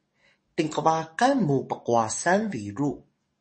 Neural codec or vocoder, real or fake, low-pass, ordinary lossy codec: codec, 44.1 kHz, 7.8 kbps, DAC; fake; 10.8 kHz; MP3, 32 kbps